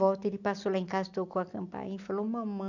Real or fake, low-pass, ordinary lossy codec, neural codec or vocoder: real; 7.2 kHz; none; none